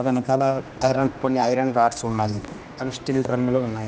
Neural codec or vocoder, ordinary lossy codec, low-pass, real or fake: codec, 16 kHz, 1 kbps, X-Codec, HuBERT features, trained on general audio; none; none; fake